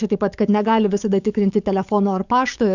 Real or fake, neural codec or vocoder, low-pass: fake; codec, 44.1 kHz, 7.8 kbps, DAC; 7.2 kHz